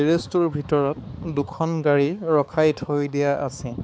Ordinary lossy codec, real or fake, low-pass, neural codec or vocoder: none; fake; none; codec, 16 kHz, 4 kbps, X-Codec, HuBERT features, trained on balanced general audio